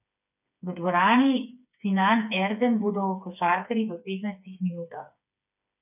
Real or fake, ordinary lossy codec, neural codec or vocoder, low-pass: fake; none; codec, 16 kHz, 4 kbps, FreqCodec, smaller model; 3.6 kHz